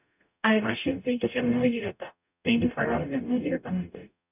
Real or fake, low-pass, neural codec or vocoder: fake; 3.6 kHz; codec, 44.1 kHz, 0.9 kbps, DAC